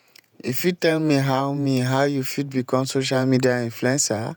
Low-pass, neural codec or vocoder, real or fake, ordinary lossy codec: none; vocoder, 48 kHz, 128 mel bands, Vocos; fake; none